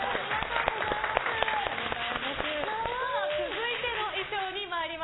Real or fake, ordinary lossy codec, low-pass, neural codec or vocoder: real; AAC, 16 kbps; 7.2 kHz; none